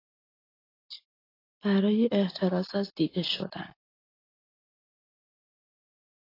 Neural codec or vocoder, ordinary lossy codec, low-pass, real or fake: none; AAC, 24 kbps; 5.4 kHz; real